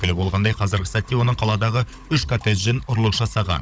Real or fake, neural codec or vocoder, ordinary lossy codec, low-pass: fake; codec, 16 kHz, 16 kbps, FunCodec, trained on Chinese and English, 50 frames a second; none; none